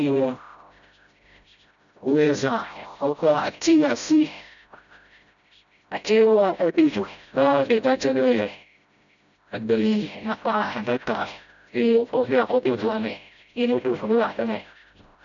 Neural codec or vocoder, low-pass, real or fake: codec, 16 kHz, 0.5 kbps, FreqCodec, smaller model; 7.2 kHz; fake